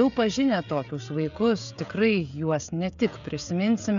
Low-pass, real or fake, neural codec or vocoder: 7.2 kHz; fake; codec, 16 kHz, 16 kbps, FreqCodec, smaller model